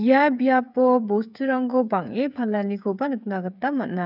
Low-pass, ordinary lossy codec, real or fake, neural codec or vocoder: 5.4 kHz; none; fake; codec, 16 kHz, 8 kbps, FreqCodec, smaller model